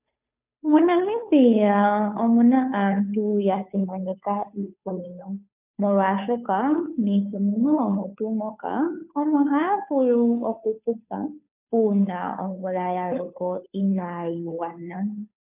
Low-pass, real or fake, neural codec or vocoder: 3.6 kHz; fake; codec, 16 kHz, 2 kbps, FunCodec, trained on Chinese and English, 25 frames a second